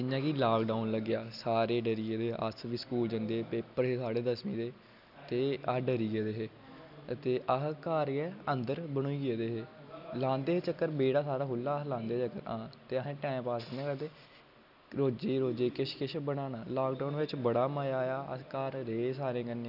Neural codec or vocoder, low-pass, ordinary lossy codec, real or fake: none; 5.4 kHz; none; real